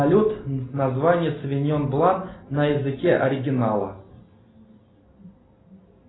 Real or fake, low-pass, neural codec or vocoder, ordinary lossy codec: real; 7.2 kHz; none; AAC, 16 kbps